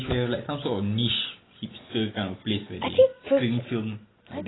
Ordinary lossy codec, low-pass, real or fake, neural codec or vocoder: AAC, 16 kbps; 7.2 kHz; real; none